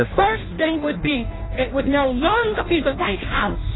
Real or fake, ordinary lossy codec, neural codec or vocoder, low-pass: fake; AAC, 16 kbps; codec, 16 kHz in and 24 kHz out, 0.6 kbps, FireRedTTS-2 codec; 7.2 kHz